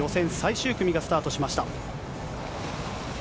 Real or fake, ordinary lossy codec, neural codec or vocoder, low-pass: real; none; none; none